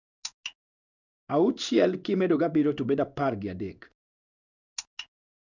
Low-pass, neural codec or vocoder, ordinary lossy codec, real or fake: 7.2 kHz; codec, 16 kHz in and 24 kHz out, 1 kbps, XY-Tokenizer; none; fake